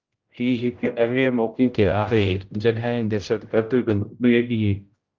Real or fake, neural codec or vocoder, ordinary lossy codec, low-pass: fake; codec, 16 kHz, 0.5 kbps, X-Codec, HuBERT features, trained on general audio; Opus, 32 kbps; 7.2 kHz